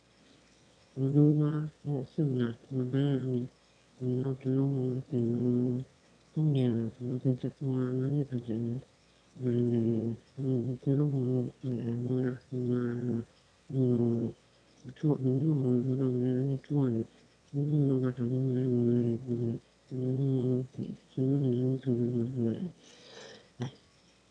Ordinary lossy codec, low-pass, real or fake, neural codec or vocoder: none; 9.9 kHz; fake; autoencoder, 22.05 kHz, a latent of 192 numbers a frame, VITS, trained on one speaker